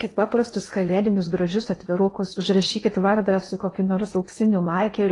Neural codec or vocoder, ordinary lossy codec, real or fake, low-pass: codec, 16 kHz in and 24 kHz out, 0.8 kbps, FocalCodec, streaming, 65536 codes; AAC, 32 kbps; fake; 10.8 kHz